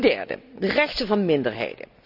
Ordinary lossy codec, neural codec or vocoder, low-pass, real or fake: none; none; 5.4 kHz; real